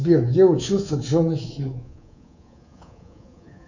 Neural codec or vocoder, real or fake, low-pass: codec, 24 kHz, 3.1 kbps, DualCodec; fake; 7.2 kHz